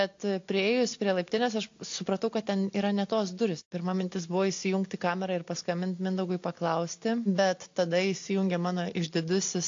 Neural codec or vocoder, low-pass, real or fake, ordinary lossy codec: none; 7.2 kHz; real; AAC, 48 kbps